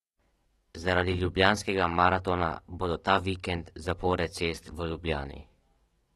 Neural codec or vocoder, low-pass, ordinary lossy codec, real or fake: codec, 44.1 kHz, 7.8 kbps, DAC; 19.8 kHz; AAC, 32 kbps; fake